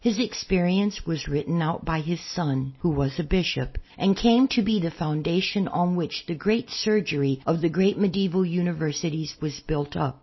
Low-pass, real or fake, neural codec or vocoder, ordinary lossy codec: 7.2 kHz; real; none; MP3, 24 kbps